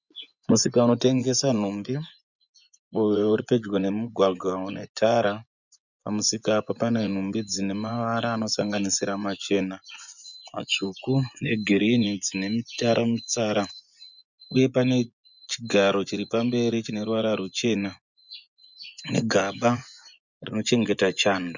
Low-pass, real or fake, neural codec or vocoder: 7.2 kHz; fake; vocoder, 24 kHz, 100 mel bands, Vocos